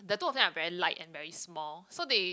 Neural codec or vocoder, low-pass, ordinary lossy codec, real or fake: none; none; none; real